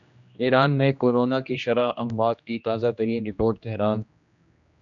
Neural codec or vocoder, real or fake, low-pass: codec, 16 kHz, 1 kbps, X-Codec, HuBERT features, trained on general audio; fake; 7.2 kHz